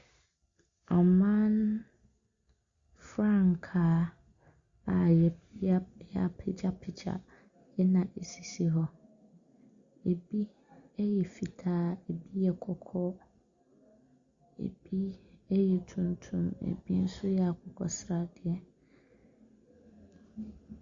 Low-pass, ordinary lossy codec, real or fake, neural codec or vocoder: 7.2 kHz; Opus, 64 kbps; real; none